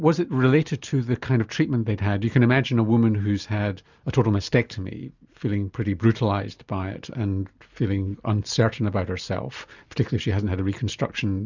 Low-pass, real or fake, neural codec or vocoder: 7.2 kHz; real; none